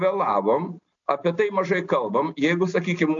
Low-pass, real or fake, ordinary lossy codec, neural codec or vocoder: 7.2 kHz; real; AAC, 64 kbps; none